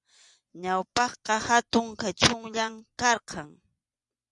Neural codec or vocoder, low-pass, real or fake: vocoder, 24 kHz, 100 mel bands, Vocos; 10.8 kHz; fake